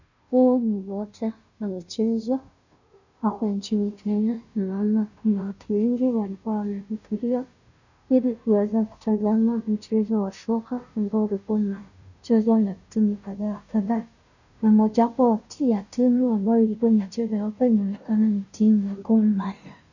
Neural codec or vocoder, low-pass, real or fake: codec, 16 kHz, 0.5 kbps, FunCodec, trained on Chinese and English, 25 frames a second; 7.2 kHz; fake